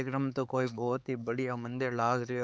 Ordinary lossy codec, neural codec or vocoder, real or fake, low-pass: none; codec, 16 kHz, 4 kbps, X-Codec, HuBERT features, trained on LibriSpeech; fake; none